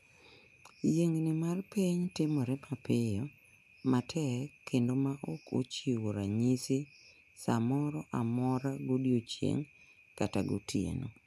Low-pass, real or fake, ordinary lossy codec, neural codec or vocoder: 14.4 kHz; real; none; none